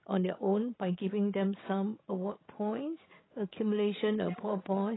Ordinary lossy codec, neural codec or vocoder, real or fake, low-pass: AAC, 16 kbps; codec, 16 kHz, 16 kbps, FreqCodec, larger model; fake; 7.2 kHz